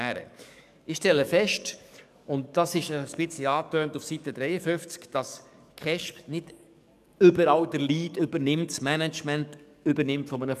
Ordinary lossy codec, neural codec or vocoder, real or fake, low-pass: none; codec, 44.1 kHz, 7.8 kbps, DAC; fake; 14.4 kHz